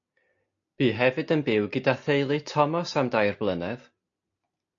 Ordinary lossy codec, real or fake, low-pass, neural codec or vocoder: AAC, 48 kbps; real; 7.2 kHz; none